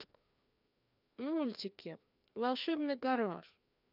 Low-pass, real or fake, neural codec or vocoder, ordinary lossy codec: 5.4 kHz; fake; codec, 16 kHz, 2 kbps, FreqCodec, larger model; none